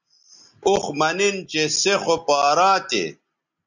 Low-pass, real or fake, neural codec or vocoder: 7.2 kHz; real; none